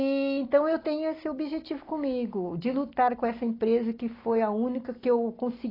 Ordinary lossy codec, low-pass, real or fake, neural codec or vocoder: AAC, 24 kbps; 5.4 kHz; real; none